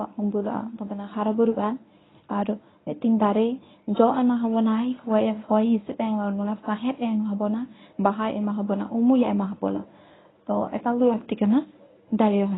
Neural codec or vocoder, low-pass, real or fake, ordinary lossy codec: codec, 24 kHz, 0.9 kbps, WavTokenizer, medium speech release version 1; 7.2 kHz; fake; AAC, 16 kbps